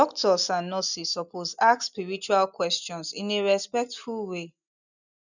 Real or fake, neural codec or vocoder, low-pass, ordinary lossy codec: real; none; 7.2 kHz; none